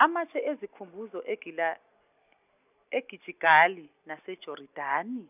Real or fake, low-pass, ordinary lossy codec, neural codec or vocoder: real; 3.6 kHz; none; none